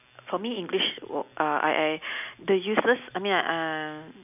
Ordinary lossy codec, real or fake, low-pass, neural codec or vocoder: none; real; 3.6 kHz; none